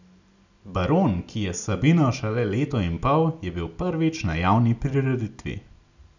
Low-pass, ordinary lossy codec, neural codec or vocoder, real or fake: 7.2 kHz; none; none; real